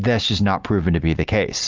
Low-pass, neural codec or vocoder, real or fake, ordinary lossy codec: 7.2 kHz; none; real; Opus, 24 kbps